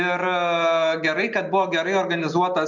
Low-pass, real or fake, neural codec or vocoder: 7.2 kHz; real; none